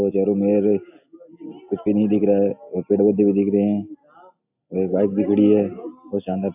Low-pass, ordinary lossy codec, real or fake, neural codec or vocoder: 3.6 kHz; AAC, 32 kbps; real; none